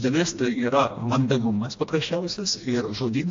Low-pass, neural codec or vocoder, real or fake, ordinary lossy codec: 7.2 kHz; codec, 16 kHz, 1 kbps, FreqCodec, smaller model; fake; AAC, 48 kbps